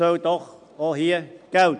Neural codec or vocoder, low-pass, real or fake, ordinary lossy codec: none; 9.9 kHz; real; none